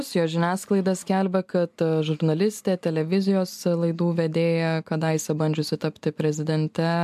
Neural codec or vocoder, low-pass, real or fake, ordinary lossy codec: none; 14.4 kHz; real; MP3, 96 kbps